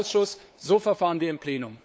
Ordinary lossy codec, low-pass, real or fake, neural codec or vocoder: none; none; fake; codec, 16 kHz, 8 kbps, FunCodec, trained on LibriTTS, 25 frames a second